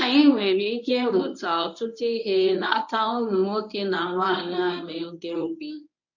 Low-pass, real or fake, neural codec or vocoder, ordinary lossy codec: 7.2 kHz; fake; codec, 24 kHz, 0.9 kbps, WavTokenizer, medium speech release version 1; none